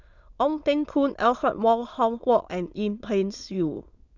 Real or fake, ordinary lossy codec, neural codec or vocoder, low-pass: fake; Opus, 64 kbps; autoencoder, 22.05 kHz, a latent of 192 numbers a frame, VITS, trained on many speakers; 7.2 kHz